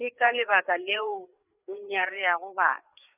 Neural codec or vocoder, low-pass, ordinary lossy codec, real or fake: codec, 16 kHz, 4 kbps, FreqCodec, larger model; 3.6 kHz; none; fake